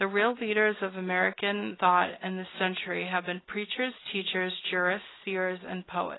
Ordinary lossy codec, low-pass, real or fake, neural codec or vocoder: AAC, 16 kbps; 7.2 kHz; real; none